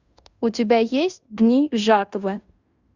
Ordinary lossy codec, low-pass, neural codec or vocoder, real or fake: Opus, 64 kbps; 7.2 kHz; codec, 16 kHz in and 24 kHz out, 0.9 kbps, LongCat-Audio-Codec, fine tuned four codebook decoder; fake